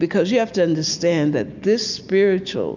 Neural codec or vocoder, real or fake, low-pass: none; real; 7.2 kHz